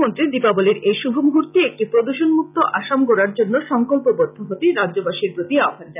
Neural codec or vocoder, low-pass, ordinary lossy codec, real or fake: none; 3.6 kHz; none; real